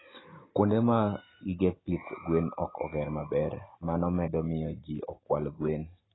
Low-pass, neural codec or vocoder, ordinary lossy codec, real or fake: 7.2 kHz; none; AAC, 16 kbps; real